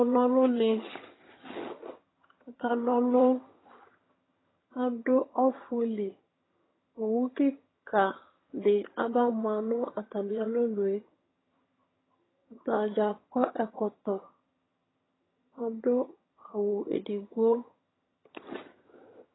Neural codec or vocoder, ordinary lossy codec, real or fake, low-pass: vocoder, 22.05 kHz, 80 mel bands, HiFi-GAN; AAC, 16 kbps; fake; 7.2 kHz